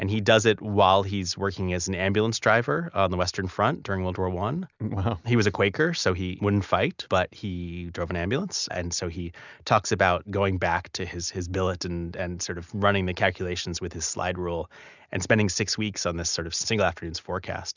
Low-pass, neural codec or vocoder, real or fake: 7.2 kHz; none; real